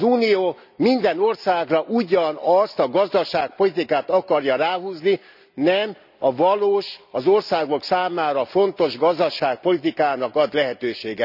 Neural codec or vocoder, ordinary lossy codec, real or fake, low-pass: none; none; real; 5.4 kHz